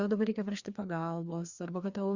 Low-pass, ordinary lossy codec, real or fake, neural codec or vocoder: 7.2 kHz; Opus, 64 kbps; fake; codec, 16 kHz, 2 kbps, FreqCodec, larger model